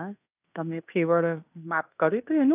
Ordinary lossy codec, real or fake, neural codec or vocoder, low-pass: none; fake; codec, 16 kHz in and 24 kHz out, 0.9 kbps, LongCat-Audio-Codec, fine tuned four codebook decoder; 3.6 kHz